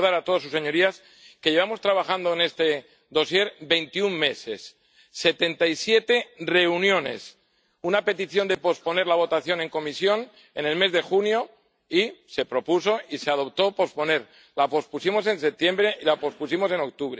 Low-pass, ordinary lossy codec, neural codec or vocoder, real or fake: none; none; none; real